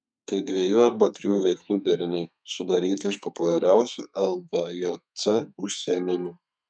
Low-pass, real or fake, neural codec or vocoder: 9.9 kHz; fake; codec, 32 kHz, 1.9 kbps, SNAC